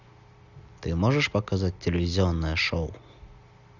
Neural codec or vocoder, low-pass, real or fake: none; 7.2 kHz; real